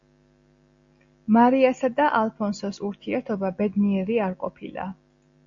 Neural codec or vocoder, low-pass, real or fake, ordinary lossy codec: none; 7.2 kHz; real; Opus, 64 kbps